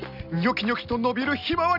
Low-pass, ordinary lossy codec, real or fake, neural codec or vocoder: 5.4 kHz; none; real; none